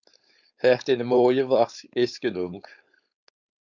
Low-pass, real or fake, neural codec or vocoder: 7.2 kHz; fake; codec, 16 kHz, 4.8 kbps, FACodec